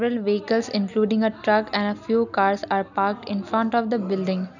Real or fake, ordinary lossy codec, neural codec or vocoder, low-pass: real; none; none; 7.2 kHz